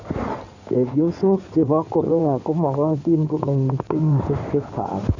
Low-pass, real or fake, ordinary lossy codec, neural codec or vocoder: 7.2 kHz; fake; none; codec, 16 kHz in and 24 kHz out, 2.2 kbps, FireRedTTS-2 codec